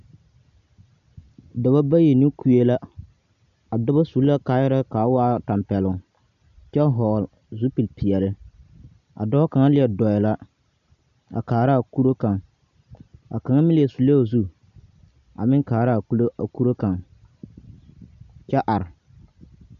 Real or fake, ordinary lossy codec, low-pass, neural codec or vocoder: real; MP3, 96 kbps; 7.2 kHz; none